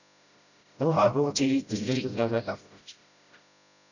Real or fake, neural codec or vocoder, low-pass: fake; codec, 16 kHz, 0.5 kbps, FreqCodec, smaller model; 7.2 kHz